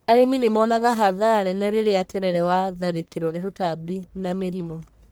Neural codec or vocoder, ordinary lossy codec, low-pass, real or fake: codec, 44.1 kHz, 1.7 kbps, Pupu-Codec; none; none; fake